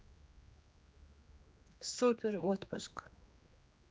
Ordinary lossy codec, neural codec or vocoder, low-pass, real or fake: none; codec, 16 kHz, 2 kbps, X-Codec, HuBERT features, trained on general audio; none; fake